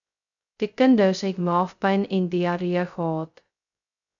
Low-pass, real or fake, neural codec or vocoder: 7.2 kHz; fake; codec, 16 kHz, 0.2 kbps, FocalCodec